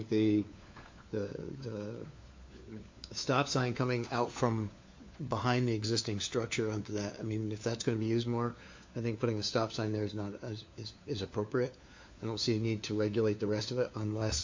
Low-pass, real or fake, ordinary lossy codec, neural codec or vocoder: 7.2 kHz; fake; MP3, 48 kbps; codec, 16 kHz, 4 kbps, FunCodec, trained on LibriTTS, 50 frames a second